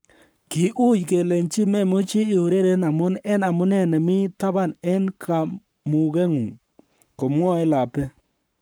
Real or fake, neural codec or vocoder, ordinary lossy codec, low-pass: fake; codec, 44.1 kHz, 7.8 kbps, Pupu-Codec; none; none